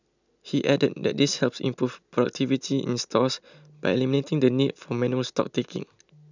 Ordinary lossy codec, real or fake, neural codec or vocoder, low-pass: none; real; none; 7.2 kHz